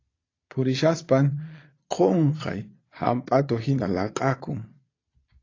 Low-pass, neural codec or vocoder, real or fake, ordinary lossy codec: 7.2 kHz; vocoder, 22.05 kHz, 80 mel bands, Vocos; fake; AAC, 32 kbps